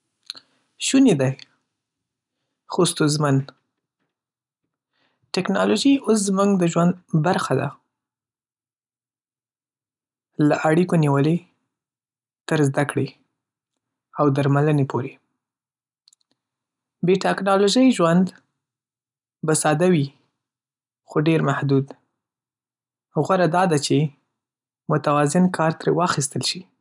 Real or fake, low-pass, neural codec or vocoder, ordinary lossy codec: real; 10.8 kHz; none; none